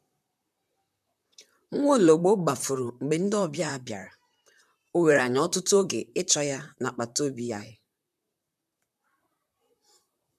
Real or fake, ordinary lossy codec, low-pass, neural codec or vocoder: fake; none; 14.4 kHz; vocoder, 44.1 kHz, 128 mel bands, Pupu-Vocoder